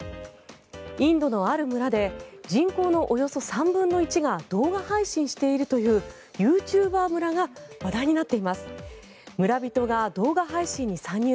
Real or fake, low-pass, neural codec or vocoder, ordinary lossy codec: real; none; none; none